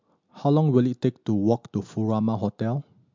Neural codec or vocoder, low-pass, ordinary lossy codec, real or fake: none; 7.2 kHz; MP3, 64 kbps; real